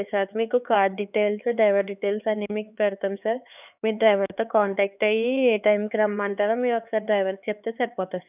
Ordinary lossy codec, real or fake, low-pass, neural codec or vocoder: none; fake; 3.6 kHz; codec, 16 kHz, 4 kbps, X-Codec, HuBERT features, trained on LibriSpeech